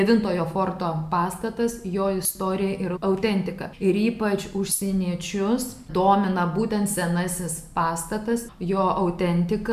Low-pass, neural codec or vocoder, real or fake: 14.4 kHz; none; real